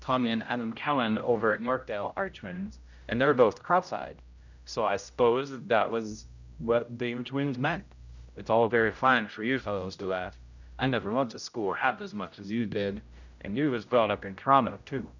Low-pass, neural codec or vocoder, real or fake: 7.2 kHz; codec, 16 kHz, 0.5 kbps, X-Codec, HuBERT features, trained on general audio; fake